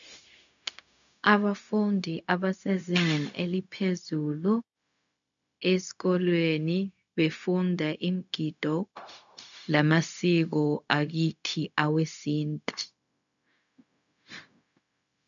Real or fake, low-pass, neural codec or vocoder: fake; 7.2 kHz; codec, 16 kHz, 0.4 kbps, LongCat-Audio-Codec